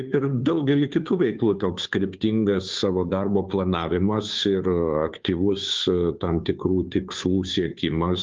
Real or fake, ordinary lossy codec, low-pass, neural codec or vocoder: fake; Opus, 32 kbps; 7.2 kHz; codec, 16 kHz, 2 kbps, FunCodec, trained on Chinese and English, 25 frames a second